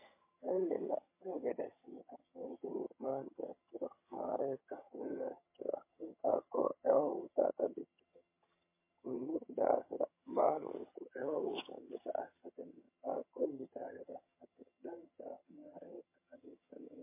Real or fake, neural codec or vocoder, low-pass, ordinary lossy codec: fake; vocoder, 22.05 kHz, 80 mel bands, HiFi-GAN; 3.6 kHz; MP3, 24 kbps